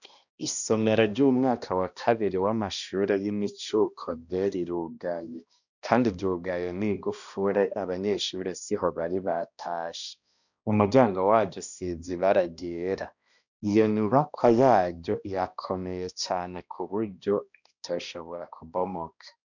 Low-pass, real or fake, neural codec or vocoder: 7.2 kHz; fake; codec, 16 kHz, 1 kbps, X-Codec, HuBERT features, trained on balanced general audio